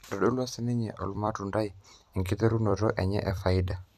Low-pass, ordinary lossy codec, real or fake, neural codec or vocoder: 14.4 kHz; none; fake; vocoder, 44.1 kHz, 128 mel bands every 256 samples, BigVGAN v2